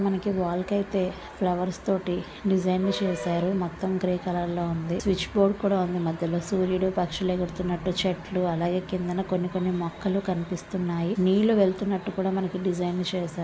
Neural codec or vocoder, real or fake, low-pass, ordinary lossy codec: none; real; none; none